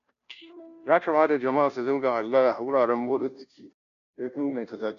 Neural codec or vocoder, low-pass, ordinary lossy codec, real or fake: codec, 16 kHz, 0.5 kbps, FunCodec, trained on Chinese and English, 25 frames a second; 7.2 kHz; none; fake